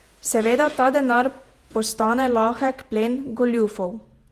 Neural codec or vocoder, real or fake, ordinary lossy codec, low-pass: vocoder, 48 kHz, 128 mel bands, Vocos; fake; Opus, 24 kbps; 14.4 kHz